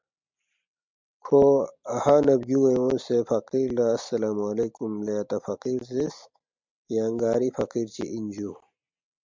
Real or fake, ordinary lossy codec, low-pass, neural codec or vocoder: real; MP3, 64 kbps; 7.2 kHz; none